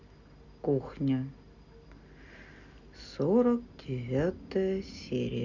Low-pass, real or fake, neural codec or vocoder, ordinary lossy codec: 7.2 kHz; real; none; none